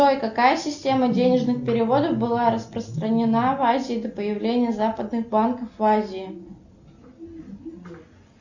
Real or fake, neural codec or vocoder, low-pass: real; none; 7.2 kHz